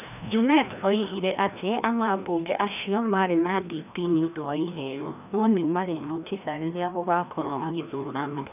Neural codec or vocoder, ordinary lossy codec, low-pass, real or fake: codec, 16 kHz, 1 kbps, FreqCodec, larger model; none; 3.6 kHz; fake